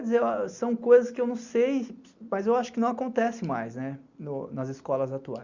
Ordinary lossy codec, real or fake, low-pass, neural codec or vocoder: Opus, 64 kbps; real; 7.2 kHz; none